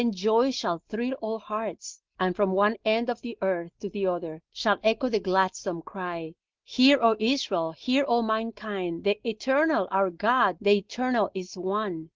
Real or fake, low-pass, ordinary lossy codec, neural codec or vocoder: real; 7.2 kHz; Opus, 16 kbps; none